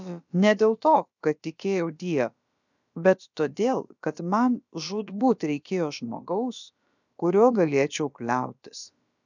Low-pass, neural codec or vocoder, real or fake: 7.2 kHz; codec, 16 kHz, about 1 kbps, DyCAST, with the encoder's durations; fake